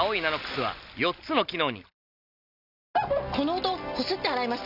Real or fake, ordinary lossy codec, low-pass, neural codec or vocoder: real; none; 5.4 kHz; none